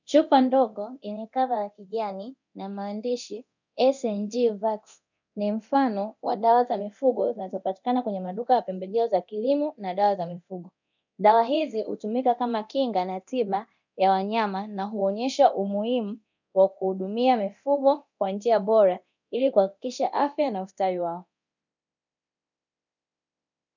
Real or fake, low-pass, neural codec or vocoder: fake; 7.2 kHz; codec, 24 kHz, 0.9 kbps, DualCodec